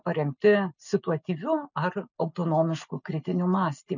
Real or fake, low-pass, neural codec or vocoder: real; 7.2 kHz; none